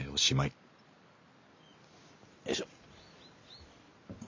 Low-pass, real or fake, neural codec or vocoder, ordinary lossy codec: 7.2 kHz; real; none; MP3, 48 kbps